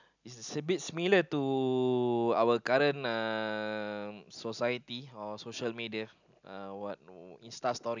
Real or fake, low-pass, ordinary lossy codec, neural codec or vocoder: real; 7.2 kHz; none; none